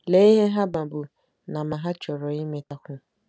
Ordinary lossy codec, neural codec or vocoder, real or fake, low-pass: none; none; real; none